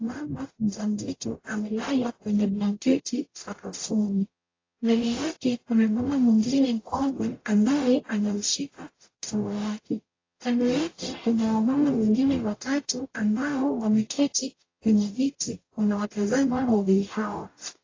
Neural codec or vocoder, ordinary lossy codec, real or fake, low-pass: codec, 44.1 kHz, 0.9 kbps, DAC; AAC, 32 kbps; fake; 7.2 kHz